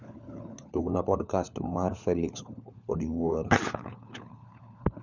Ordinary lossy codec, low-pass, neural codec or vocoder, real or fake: none; 7.2 kHz; codec, 16 kHz, 4 kbps, FunCodec, trained on LibriTTS, 50 frames a second; fake